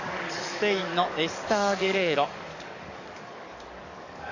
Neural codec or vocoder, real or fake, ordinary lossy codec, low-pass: codec, 44.1 kHz, 7.8 kbps, DAC; fake; none; 7.2 kHz